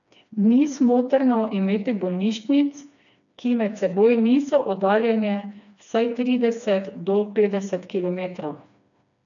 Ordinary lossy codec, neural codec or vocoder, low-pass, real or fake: none; codec, 16 kHz, 2 kbps, FreqCodec, smaller model; 7.2 kHz; fake